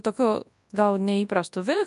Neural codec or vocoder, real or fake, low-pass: codec, 24 kHz, 0.9 kbps, WavTokenizer, large speech release; fake; 10.8 kHz